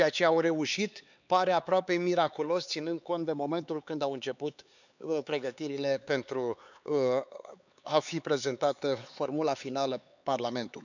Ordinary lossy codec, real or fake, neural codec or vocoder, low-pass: none; fake; codec, 16 kHz, 4 kbps, X-Codec, HuBERT features, trained on LibriSpeech; 7.2 kHz